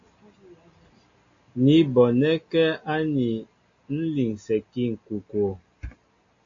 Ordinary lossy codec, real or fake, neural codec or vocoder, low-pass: AAC, 48 kbps; real; none; 7.2 kHz